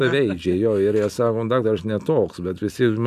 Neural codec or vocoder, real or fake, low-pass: none; real; 14.4 kHz